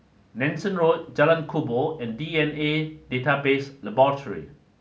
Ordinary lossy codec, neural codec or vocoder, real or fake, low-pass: none; none; real; none